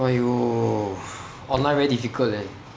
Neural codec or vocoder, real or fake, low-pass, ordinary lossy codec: none; real; none; none